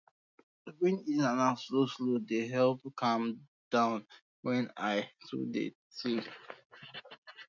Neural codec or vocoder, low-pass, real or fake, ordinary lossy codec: none; 7.2 kHz; real; none